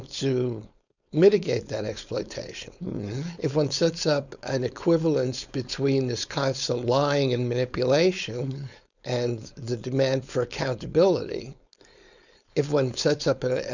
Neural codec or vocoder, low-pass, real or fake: codec, 16 kHz, 4.8 kbps, FACodec; 7.2 kHz; fake